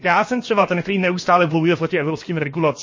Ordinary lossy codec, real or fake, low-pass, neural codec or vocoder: MP3, 32 kbps; fake; 7.2 kHz; codec, 16 kHz, about 1 kbps, DyCAST, with the encoder's durations